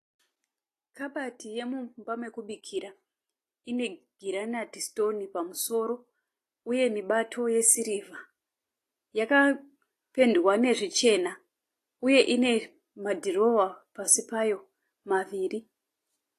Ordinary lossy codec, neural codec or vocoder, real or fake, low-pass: AAC, 48 kbps; none; real; 14.4 kHz